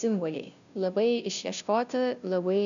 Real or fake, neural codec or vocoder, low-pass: fake; codec, 16 kHz, 0.5 kbps, FunCodec, trained on Chinese and English, 25 frames a second; 7.2 kHz